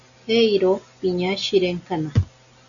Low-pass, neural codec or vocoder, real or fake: 7.2 kHz; none; real